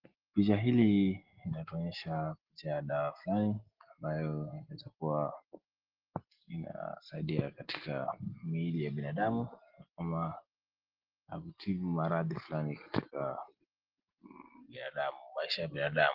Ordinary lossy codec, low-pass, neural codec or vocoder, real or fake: Opus, 16 kbps; 5.4 kHz; none; real